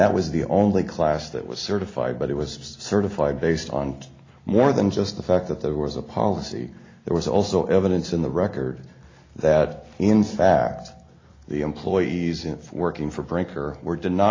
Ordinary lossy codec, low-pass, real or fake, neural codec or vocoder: AAC, 32 kbps; 7.2 kHz; real; none